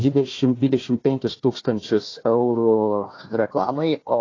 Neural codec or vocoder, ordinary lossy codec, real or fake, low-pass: codec, 16 kHz, 1 kbps, FunCodec, trained on Chinese and English, 50 frames a second; AAC, 32 kbps; fake; 7.2 kHz